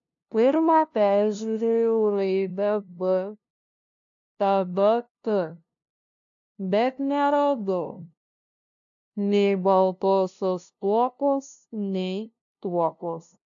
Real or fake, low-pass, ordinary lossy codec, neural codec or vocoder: fake; 7.2 kHz; AAC, 64 kbps; codec, 16 kHz, 0.5 kbps, FunCodec, trained on LibriTTS, 25 frames a second